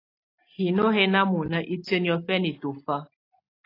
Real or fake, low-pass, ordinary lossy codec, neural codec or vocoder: real; 5.4 kHz; AAC, 32 kbps; none